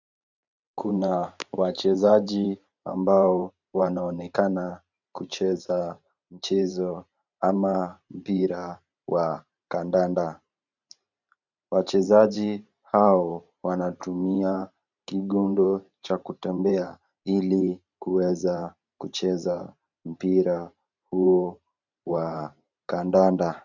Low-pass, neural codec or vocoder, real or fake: 7.2 kHz; vocoder, 44.1 kHz, 128 mel bands every 512 samples, BigVGAN v2; fake